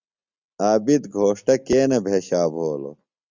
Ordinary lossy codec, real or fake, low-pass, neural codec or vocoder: Opus, 64 kbps; real; 7.2 kHz; none